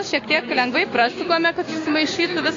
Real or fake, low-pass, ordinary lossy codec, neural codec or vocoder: fake; 7.2 kHz; AAC, 32 kbps; codec, 16 kHz, 6 kbps, DAC